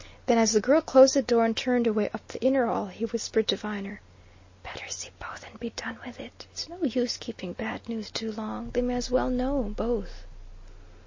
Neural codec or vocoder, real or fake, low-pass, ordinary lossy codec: none; real; 7.2 kHz; MP3, 32 kbps